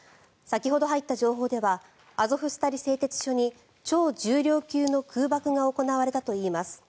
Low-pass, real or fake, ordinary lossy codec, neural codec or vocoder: none; real; none; none